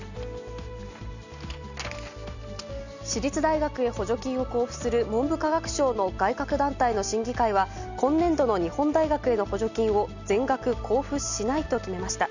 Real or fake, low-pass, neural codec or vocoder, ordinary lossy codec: real; 7.2 kHz; none; none